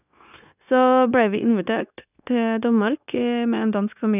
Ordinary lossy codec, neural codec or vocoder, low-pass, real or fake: none; codec, 16 kHz, 0.9 kbps, LongCat-Audio-Codec; 3.6 kHz; fake